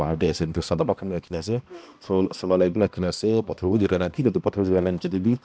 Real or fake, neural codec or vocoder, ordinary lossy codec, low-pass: fake; codec, 16 kHz, 1 kbps, X-Codec, HuBERT features, trained on balanced general audio; none; none